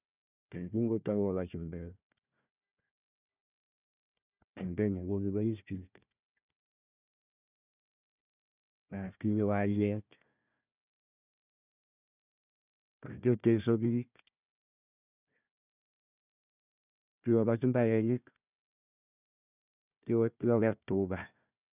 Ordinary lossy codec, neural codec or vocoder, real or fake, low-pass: none; codec, 16 kHz, 1 kbps, FunCodec, trained on Chinese and English, 50 frames a second; fake; 3.6 kHz